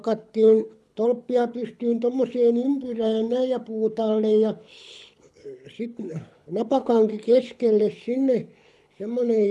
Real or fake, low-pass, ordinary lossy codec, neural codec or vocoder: fake; none; none; codec, 24 kHz, 6 kbps, HILCodec